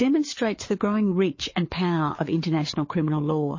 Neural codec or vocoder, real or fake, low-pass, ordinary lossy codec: codec, 16 kHz, 4 kbps, FreqCodec, larger model; fake; 7.2 kHz; MP3, 32 kbps